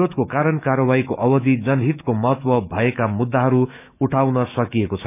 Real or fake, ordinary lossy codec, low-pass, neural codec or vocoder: fake; none; 3.6 kHz; autoencoder, 48 kHz, 128 numbers a frame, DAC-VAE, trained on Japanese speech